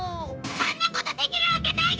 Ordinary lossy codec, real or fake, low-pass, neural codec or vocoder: none; fake; none; codec, 16 kHz, 0.9 kbps, LongCat-Audio-Codec